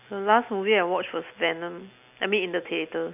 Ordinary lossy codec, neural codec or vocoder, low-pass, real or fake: none; none; 3.6 kHz; real